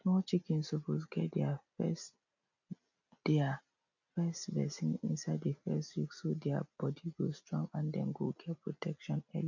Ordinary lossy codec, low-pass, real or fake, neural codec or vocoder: none; 7.2 kHz; real; none